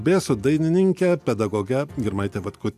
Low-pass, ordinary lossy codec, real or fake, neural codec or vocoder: 14.4 kHz; AAC, 96 kbps; real; none